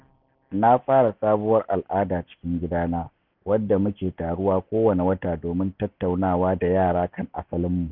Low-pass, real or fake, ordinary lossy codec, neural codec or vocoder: 5.4 kHz; real; none; none